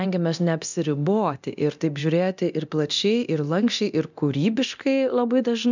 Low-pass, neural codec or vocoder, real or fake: 7.2 kHz; codec, 24 kHz, 0.9 kbps, DualCodec; fake